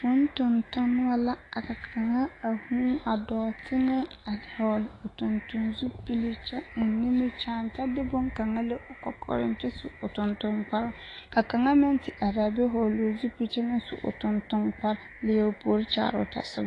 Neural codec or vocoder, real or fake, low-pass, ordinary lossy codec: autoencoder, 48 kHz, 128 numbers a frame, DAC-VAE, trained on Japanese speech; fake; 10.8 kHz; AAC, 32 kbps